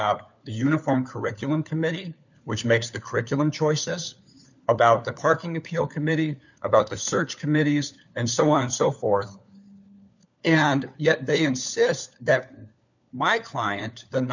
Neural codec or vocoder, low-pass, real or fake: codec, 16 kHz, 4 kbps, FunCodec, trained on LibriTTS, 50 frames a second; 7.2 kHz; fake